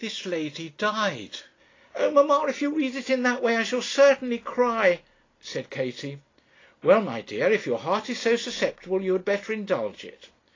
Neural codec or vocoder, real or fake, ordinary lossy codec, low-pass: none; real; AAC, 32 kbps; 7.2 kHz